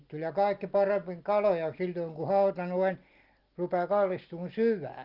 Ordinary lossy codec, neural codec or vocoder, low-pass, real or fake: Opus, 32 kbps; none; 5.4 kHz; real